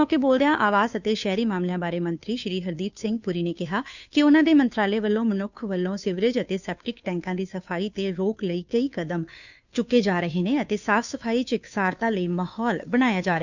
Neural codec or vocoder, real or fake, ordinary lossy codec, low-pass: codec, 16 kHz, 2 kbps, FunCodec, trained on Chinese and English, 25 frames a second; fake; none; 7.2 kHz